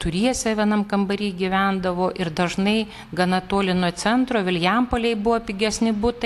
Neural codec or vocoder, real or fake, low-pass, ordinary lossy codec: none; real; 14.4 kHz; AAC, 64 kbps